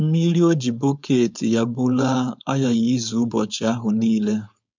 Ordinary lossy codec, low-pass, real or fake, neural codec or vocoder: MP3, 64 kbps; 7.2 kHz; fake; codec, 16 kHz, 4.8 kbps, FACodec